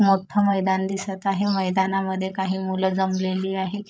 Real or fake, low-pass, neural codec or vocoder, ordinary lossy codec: fake; none; codec, 16 kHz, 16 kbps, FreqCodec, larger model; none